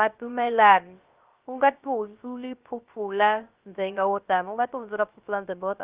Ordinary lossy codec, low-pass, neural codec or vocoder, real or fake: Opus, 24 kbps; 3.6 kHz; codec, 16 kHz, 0.3 kbps, FocalCodec; fake